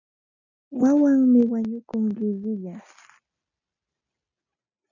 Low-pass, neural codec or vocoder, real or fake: 7.2 kHz; none; real